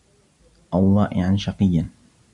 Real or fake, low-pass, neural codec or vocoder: real; 10.8 kHz; none